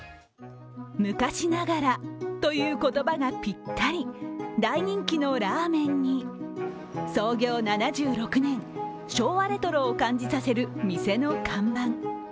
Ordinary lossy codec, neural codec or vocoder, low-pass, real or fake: none; none; none; real